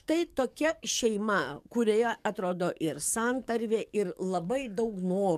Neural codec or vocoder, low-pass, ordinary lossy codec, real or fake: codec, 44.1 kHz, 7.8 kbps, DAC; 14.4 kHz; MP3, 96 kbps; fake